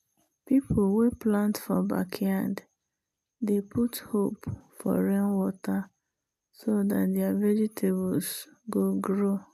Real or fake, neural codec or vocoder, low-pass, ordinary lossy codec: real; none; 14.4 kHz; none